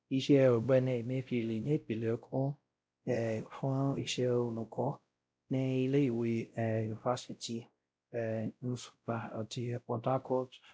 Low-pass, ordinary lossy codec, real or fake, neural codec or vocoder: none; none; fake; codec, 16 kHz, 0.5 kbps, X-Codec, WavLM features, trained on Multilingual LibriSpeech